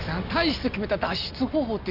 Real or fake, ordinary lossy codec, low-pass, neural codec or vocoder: real; none; 5.4 kHz; none